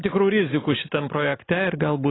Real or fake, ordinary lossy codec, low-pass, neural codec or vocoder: real; AAC, 16 kbps; 7.2 kHz; none